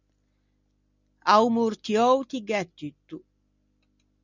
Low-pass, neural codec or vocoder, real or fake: 7.2 kHz; none; real